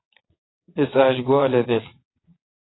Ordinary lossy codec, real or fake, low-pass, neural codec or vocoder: AAC, 16 kbps; fake; 7.2 kHz; vocoder, 22.05 kHz, 80 mel bands, WaveNeXt